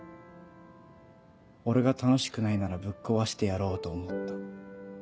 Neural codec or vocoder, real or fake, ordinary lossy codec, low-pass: none; real; none; none